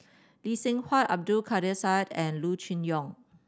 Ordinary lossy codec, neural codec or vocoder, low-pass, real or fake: none; none; none; real